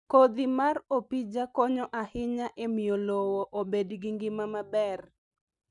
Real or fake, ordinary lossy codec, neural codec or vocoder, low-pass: real; none; none; 10.8 kHz